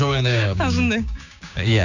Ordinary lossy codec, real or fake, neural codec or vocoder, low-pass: none; fake; codec, 16 kHz, 6 kbps, DAC; 7.2 kHz